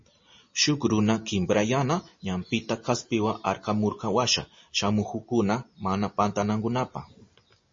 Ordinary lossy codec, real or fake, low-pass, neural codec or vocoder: MP3, 32 kbps; real; 7.2 kHz; none